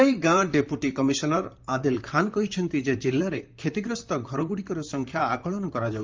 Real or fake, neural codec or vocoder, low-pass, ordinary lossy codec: fake; vocoder, 44.1 kHz, 128 mel bands, Pupu-Vocoder; 7.2 kHz; Opus, 32 kbps